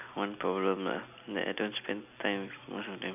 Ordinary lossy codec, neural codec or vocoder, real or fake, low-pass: none; none; real; 3.6 kHz